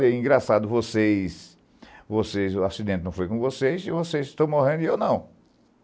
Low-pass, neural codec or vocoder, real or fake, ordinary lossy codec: none; none; real; none